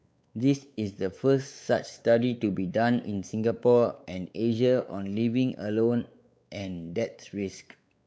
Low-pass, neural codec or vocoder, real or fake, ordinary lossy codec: none; codec, 16 kHz, 4 kbps, X-Codec, WavLM features, trained on Multilingual LibriSpeech; fake; none